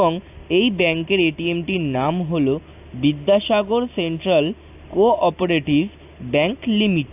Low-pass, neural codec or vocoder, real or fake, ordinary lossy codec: 3.6 kHz; none; real; AAC, 32 kbps